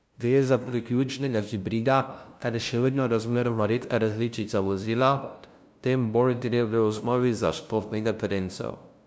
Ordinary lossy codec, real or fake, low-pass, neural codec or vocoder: none; fake; none; codec, 16 kHz, 0.5 kbps, FunCodec, trained on LibriTTS, 25 frames a second